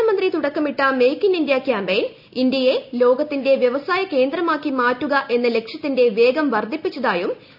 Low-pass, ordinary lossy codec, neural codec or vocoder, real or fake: 5.4 kHz; none; none; real